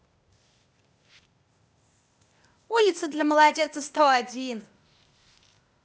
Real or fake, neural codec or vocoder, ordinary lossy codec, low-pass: fake; codec, 16 kHz, 0.8 kbps, ZipCodec; none; none